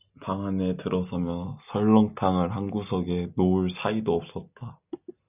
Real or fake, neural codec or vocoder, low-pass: real; none; 3.6 kHz